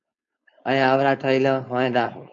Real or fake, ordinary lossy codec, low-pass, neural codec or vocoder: fake; MP3, 48 kbps; 7.2 kHz; codec, 16 kHz, 4.8 kbps, FACodec